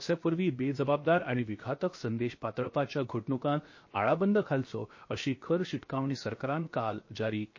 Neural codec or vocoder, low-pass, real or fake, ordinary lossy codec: codec, 16 kHz, 0.7 kbps, FocalCodec; 7.2 kHz; fake; MP3, 32 kbps